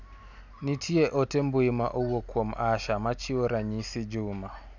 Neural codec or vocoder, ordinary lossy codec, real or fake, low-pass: none; none; real; 7.2 kHz